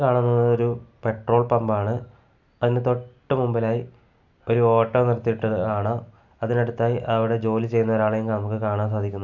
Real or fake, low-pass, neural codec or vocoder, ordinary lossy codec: real; 7.2 kHz; none; none